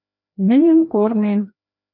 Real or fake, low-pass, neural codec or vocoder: fake; 5.4 kHz; codec, 16 kHz, 1 kbps, FreqCodec, larger model